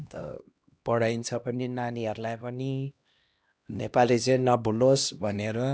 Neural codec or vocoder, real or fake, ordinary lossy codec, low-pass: codec, 16 kHz, 1 kbps, X-Codec, HuBERT features, trained on LibriSpeech; fake; none; none